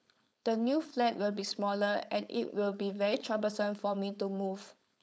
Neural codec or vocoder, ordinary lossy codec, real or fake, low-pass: codec, 16 kHz, 4.8 kbps, FACodec; none; fake; none